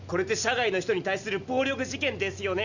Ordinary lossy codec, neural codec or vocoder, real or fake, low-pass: none; none; real; 7.2 kHz